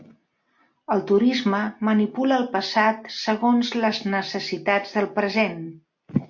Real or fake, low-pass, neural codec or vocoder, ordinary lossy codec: real; 7.2 kHz; none; MP3, 48 kbps